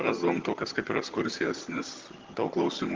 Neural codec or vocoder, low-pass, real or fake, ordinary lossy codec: vocoder, 22.05 kHz, 80 mel bands, HiFi-GAN; 7.2 kHz; fake; Opus, 16 kbps